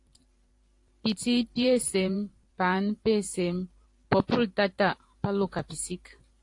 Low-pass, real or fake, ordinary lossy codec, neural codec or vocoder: 10.8 kHz; fake; AAC, 48 kbps; vocoder, 44.1 kHz, 128 mel bands every 512 samples, BigVGAN v2